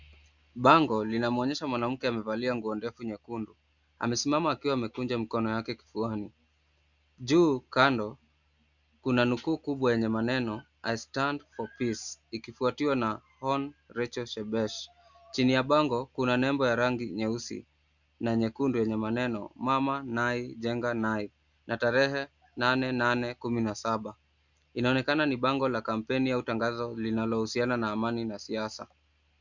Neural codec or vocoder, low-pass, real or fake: none; 7.2 kHz; real